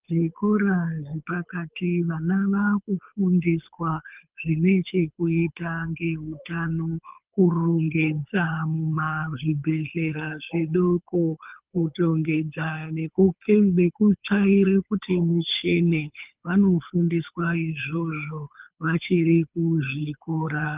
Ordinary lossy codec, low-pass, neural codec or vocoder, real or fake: Opus, 24 kbps; 3.6 kHz; codec, 24 kHz, 6 kbps, HILCodec; fake